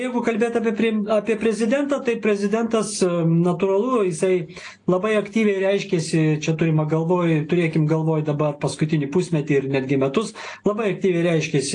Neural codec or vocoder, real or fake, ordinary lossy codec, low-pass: none; real; AAC, 48 kbps; 9.9 kHz